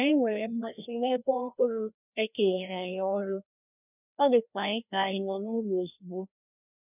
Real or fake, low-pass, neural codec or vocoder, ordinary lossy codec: fake; 3.6 kHz; codec, 16 kHz, 1 kbps, FreqCodec, larger model; none